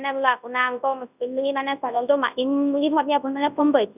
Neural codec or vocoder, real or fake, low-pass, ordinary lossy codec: codec, 24 kHz, 0.9 kbps, WavTokenizer, large speech release; fake; 3.6 kHz; none